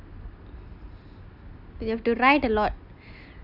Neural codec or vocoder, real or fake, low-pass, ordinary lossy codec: none; real; 5.4 kHz; none